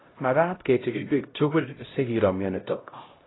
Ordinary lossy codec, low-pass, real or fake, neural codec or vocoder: AAC, 16 kbps; 7.2 kHz; fake; codec, 16 kHz, 0.5 kbps, X-Codec, HuBERT features, trained on LibriSpeech